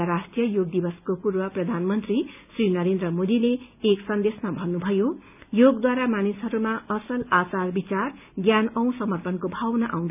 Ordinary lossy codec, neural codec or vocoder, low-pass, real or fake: none; none; 3.6 kHz; real